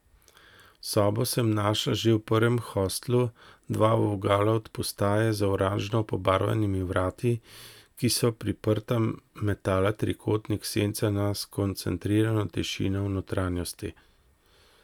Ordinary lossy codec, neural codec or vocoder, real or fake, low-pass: none; none; real; 19.8 kHz